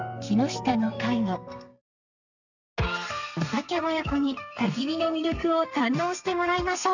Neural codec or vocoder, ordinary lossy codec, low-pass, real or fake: codec, 32 kHz, 1.9 kbps, SNAC; none; 7.2 kHz; fake